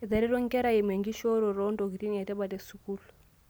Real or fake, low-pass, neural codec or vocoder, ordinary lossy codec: real; none; none; none